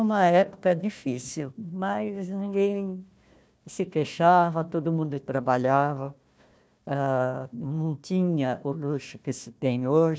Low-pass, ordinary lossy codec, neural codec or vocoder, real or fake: none; none; codec, 16 kHz, 1 kbps, FunCodec, trained on Chinese and English, 50 frames a second; fake